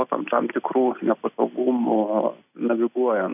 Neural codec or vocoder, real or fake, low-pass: none; real; 3.6 kHz